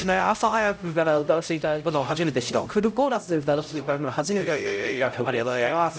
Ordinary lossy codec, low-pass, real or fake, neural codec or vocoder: none; none; fake; codec, 16 kHz, 0.5 kbps, X-Codec, HuBERT features, trained on LibriSpeech